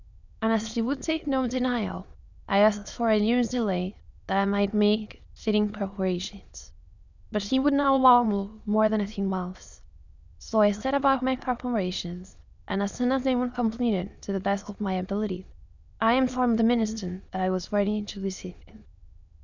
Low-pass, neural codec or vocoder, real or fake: 7.2 kHz; autoencoder, 22.05 kHz, a latent of 192 numbers a frame, VITS, trained on many speakers; fake